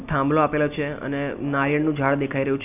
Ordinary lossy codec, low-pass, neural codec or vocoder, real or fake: none; 3.6 kHz; none; real